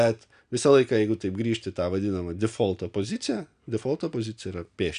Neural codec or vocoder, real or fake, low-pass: none; real; 9.9 kHz